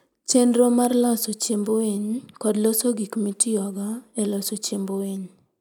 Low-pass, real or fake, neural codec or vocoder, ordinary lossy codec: none; real; none; none